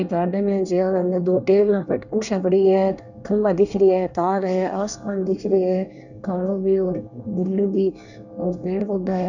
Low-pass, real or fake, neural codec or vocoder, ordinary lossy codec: 7.2 kHz; fake; codec, 24 kHz, 1 kbps, SNAC; none